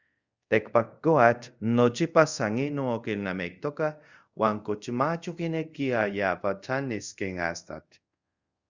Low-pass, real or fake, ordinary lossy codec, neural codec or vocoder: 7.2 kHz; fake; Opus, 64 kbps; codec, 24 kHz, 0.5 kbps, DualCodec